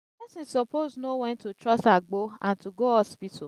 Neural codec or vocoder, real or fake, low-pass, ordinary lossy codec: none; real; 14.4 kHz; Opus, 32 kbps